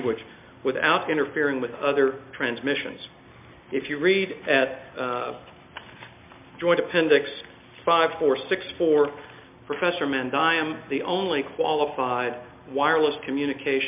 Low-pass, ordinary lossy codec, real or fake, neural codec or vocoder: 3.6 kHz; MP3, 32 kbps; real; none